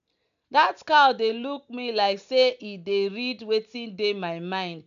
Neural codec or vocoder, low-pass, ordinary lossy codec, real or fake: none; 7.2 kHz; AAC, 96 kbps; real